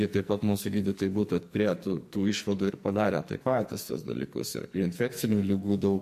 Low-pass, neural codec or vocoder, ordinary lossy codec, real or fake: 14.4 kHz; codec, 44.1 kHz, 2.6 kbps, SNAC; MP3, 64 kbps; fake